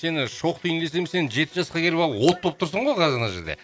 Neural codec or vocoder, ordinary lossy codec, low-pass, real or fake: none; none; none; real